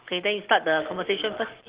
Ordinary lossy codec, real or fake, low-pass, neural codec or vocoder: Opus, 16 kbps; real; 3.6 kHz; none